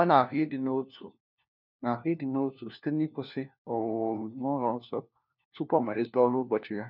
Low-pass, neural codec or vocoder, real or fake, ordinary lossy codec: 5.4 kHz; codec, 16 kHz, 1 kbps, FunCodec, trained on LibriTTS, 50 frames a second; fake; none